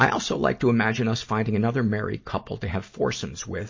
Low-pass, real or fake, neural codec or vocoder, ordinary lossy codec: 7.2 kHz; real; none; MP3, 32 kbps